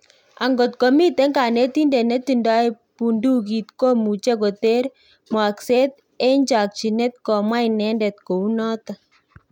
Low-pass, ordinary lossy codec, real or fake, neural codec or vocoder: 19.8 kHz; none; real; none